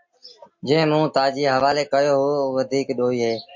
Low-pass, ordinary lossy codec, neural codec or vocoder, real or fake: 7.2 kHz; MP3, 48 kbps; none; real